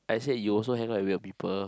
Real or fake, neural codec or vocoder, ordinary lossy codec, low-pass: real; none; none; none